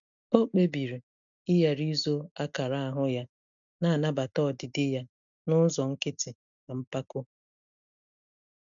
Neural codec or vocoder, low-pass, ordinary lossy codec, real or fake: none; 7.2 kHz; none; real